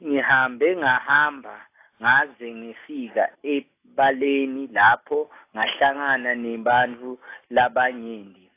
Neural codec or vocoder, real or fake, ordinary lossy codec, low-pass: none; real; AAC, 24 kbps; 3.6 kHz